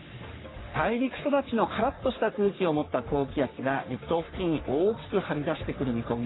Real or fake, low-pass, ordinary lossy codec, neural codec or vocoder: fake; 7.2 kHz; AAC, 16 kbps; codec, 44.1 kHz, 3.4 kbps, Pupu-Codec